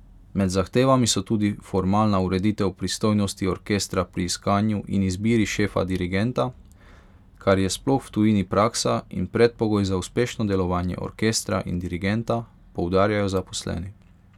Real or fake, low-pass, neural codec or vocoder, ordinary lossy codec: real; 19.8 kHz; none; none